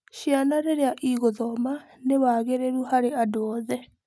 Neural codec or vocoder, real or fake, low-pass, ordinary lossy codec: none; real; none; none